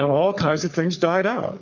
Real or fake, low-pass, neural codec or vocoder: fake; 7.2 kHz; codec, 44.1 kHz, 3.4 kbps, Pupu-Codec